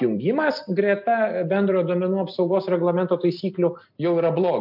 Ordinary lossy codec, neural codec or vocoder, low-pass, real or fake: MP3, 48 kbps; none; 5.4 kHz; real